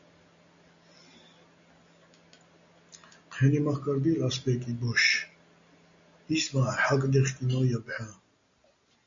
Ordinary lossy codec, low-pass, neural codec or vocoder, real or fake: AAC, 64 kbps; 7.2 kHz; none; real